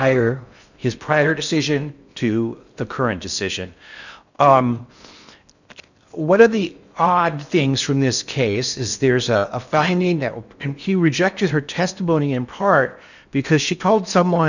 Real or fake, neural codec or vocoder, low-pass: fake; codec, 16 kHz in and 24 kHz out, 0.6 kbps, FocalCodec, streaming, 4096 codes; 7.2 kHz